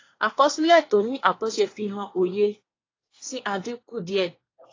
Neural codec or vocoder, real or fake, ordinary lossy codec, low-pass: codec, 24 kHz, 1 kbps, SNAC; fake; AAC, 32 kbps; 7.2 kHz